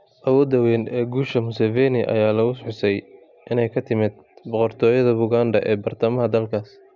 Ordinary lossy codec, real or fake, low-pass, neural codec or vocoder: none; real; 7.2 kHz; none